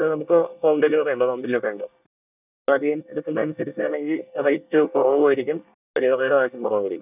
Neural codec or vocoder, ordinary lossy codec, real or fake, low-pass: codec, 24 kHz, 1 kbps, SNAC; none; fake; 3.6 kHz